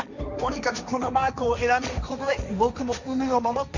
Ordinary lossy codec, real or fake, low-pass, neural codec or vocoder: none; fake; 7.2 kHz; codec, 16 kHz, 1.1 kbps, Voila-Tokenizer